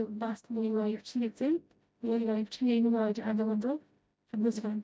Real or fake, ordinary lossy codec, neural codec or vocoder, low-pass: fake; none; codec, 16 kHz, 0.5 kbps, FreqCodec, smaller model; none